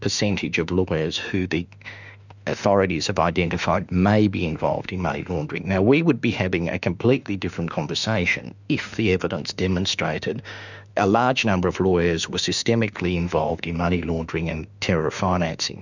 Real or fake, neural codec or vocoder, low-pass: fake; autoencoder, 48 kHz, 32 numbers a frame, DAC-VAE, trained on Japanese speech; 7.2 kHz